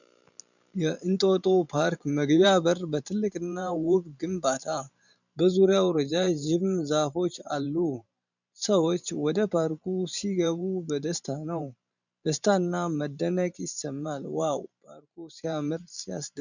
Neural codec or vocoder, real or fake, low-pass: vocoder, 44.1 kHz, 128 mel bands every 512 samples, BigVGAN v2; fake; 7.2 kHz